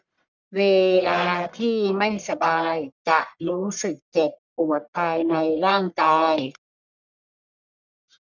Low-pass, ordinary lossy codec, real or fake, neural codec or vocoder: 7.2 kHz; none; fake; codec, 44.1 kHz, 1.7 kbps, Pupu-Codec